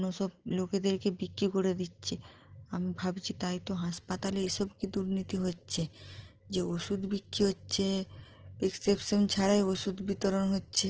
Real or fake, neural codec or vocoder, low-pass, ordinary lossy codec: real; none; 7.2 kHz; Opus, 16 kbps